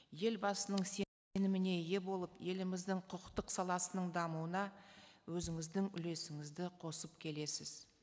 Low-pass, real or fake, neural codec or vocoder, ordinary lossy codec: none; real; none; none